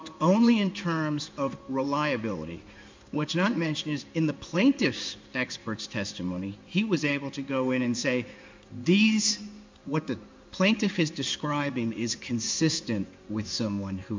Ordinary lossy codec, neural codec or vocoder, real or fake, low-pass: MP3, 64 kbps; codec, 16 kHz in and 24 kHz out, 1 kbps, XY-Tokenizer; fake; 7.2 kHz